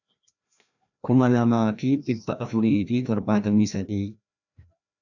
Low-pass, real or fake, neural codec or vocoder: 7.2 kHz; fake; codec, 16 kHz, 1 kbps, FreqCodec, larger model